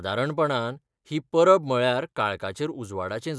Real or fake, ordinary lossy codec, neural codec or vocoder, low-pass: real; none; none; none